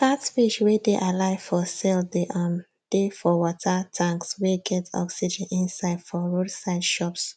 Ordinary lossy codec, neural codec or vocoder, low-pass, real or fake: none; none; none; real